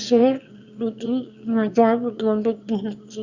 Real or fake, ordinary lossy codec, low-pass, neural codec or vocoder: fake; Opus, 64 kbps; 7.2 kHz; autoencoder, 22.05 kHz, a latent of 192 numbers a frame, VITS, trained on one speaker